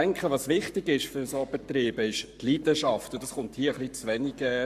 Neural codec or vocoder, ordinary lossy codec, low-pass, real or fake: codec, 44.1 kHz, 7.8 kbps, Pupu-Codec; AAC, 96 kbps; 14.4 kHz; fake